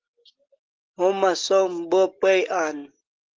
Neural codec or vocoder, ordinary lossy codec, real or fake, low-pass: none; Opus, 16 kbps; real; 7.2 kHz